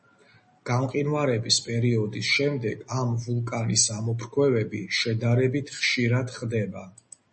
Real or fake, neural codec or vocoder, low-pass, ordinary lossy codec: real; none; 10.8 kHz; MP3, 32 kbps